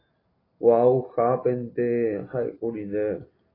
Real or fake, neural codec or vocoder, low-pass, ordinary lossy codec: real; none; 5.4 kHz; AAC, 24 kbps